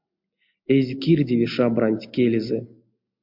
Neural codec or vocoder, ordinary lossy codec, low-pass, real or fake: none; MP3, 48 kbps; 5.4 kHz; real